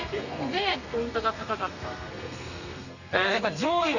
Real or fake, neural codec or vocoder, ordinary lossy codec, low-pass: fake; codec, 32 kHz, 1.9 kbps, SNAC; none; 7.2 kHz